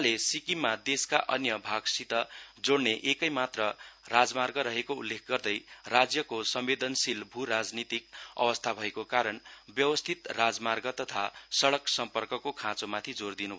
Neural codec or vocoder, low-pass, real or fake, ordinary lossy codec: none; none; real; none